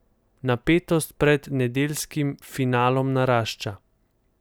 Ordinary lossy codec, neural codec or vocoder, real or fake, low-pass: none; none; real; none